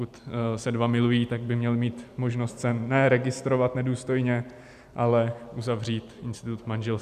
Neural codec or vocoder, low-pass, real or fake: none; 14.4 kHz; real